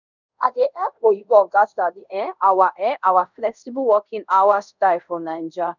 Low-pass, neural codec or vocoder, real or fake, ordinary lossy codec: 7.2 kHz; codec, 24 kHz, 0.5 kbps, DualCodec; fake; none